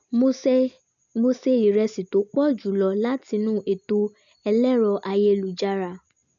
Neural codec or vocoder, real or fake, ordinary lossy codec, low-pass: none; real; none; 7.2 kHz